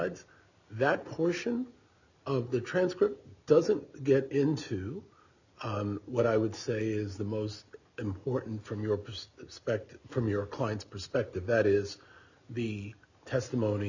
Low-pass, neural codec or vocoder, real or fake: 7.2 kHz; none; real